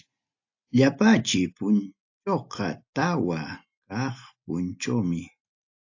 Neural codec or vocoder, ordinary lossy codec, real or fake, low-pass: none; AAC, 48 kbps; real; 7.2 kHz